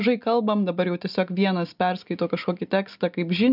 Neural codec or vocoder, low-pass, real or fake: none; 5.4 kHz; real